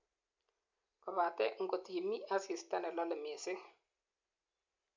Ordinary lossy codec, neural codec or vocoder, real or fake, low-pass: MP3, 64 kbps; none; real; 7.2 kHz